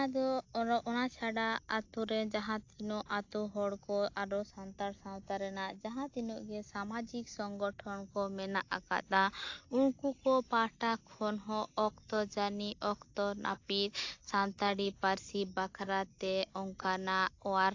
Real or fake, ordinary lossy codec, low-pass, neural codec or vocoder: real; AAC, 48 kbps; 7.2 kHz; none